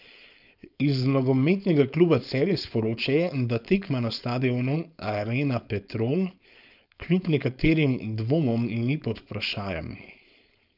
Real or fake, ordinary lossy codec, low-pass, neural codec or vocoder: fake; none; 5.4 kHz; codec, 16 kHz, 4.8 kbps, FACodec